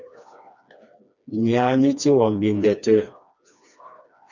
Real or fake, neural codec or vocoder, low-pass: fake; codec, 16 kHz, 2 kbps, FreqCodec, smaller model; 7.2 kHz